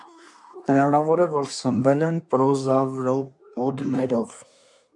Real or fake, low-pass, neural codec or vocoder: fake; 10.8 kHz; codec, 24 kHz, 1 kbps, SNAC